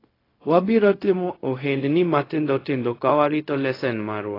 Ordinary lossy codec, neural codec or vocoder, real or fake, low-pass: AAC, 24 kbps; codec, 16 kHz, 0.4 kbps, LongCat-Audio-Codec; fake; 5.4 kHz